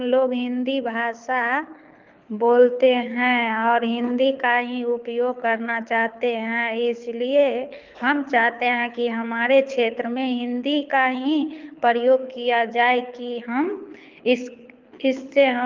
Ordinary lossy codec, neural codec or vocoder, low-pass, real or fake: Opus, 32 kbps; codec, 24 kHz, 6 kbps, HILCodec; 7.2 kHz; fake